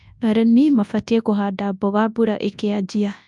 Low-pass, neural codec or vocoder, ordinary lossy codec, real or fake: 10.8 kHz; codec, 24 kHz, 0.9 kbps, WavTokenizer, large speech release; MP3, 96 kbps; fake